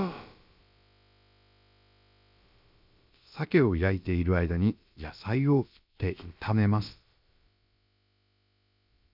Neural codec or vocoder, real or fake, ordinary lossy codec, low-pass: codec, 16 kHz, about 1 kbps, DyCAST, with the encoder's durations; fake; none; 5.4 kHz